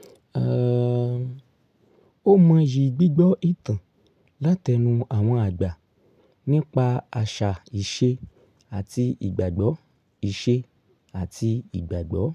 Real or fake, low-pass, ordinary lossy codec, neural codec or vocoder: real; 14.4 kHz; none; none